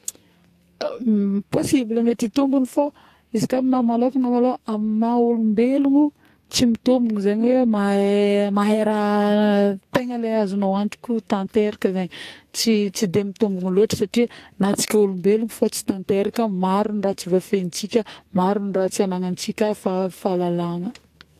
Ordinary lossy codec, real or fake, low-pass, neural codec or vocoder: AAC, 64 kbps; fake; 14.4 kHz; codec, 44.1 kHz, 2.6 kbps, SNAC